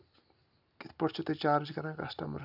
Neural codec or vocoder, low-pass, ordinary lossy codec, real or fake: none; 5.4 kHz; none; real